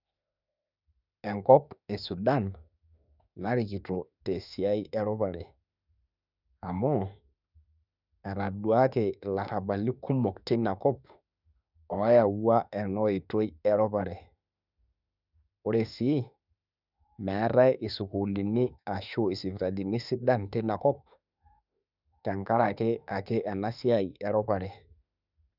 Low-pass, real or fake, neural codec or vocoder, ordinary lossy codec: 5.4 kHz; fake; autoencoder, 48 kHz, 32 numbers a frame, DAC-VAE, trained on Japanese speech; none